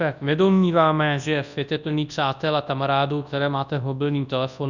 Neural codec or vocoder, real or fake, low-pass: codec, 24 kHz, 0.9 kbps, WavTokenizer, large speech release; fake; 7.2 kHz